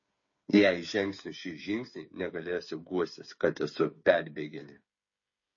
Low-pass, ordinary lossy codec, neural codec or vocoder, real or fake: 7.2 kHz; MP3, 32 kbps; codec, 16 kHz, 8 kbps, FreqCodec, smaller model; fake